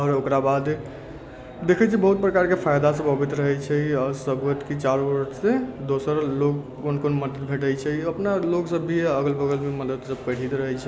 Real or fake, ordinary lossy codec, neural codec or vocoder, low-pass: real; none; none; none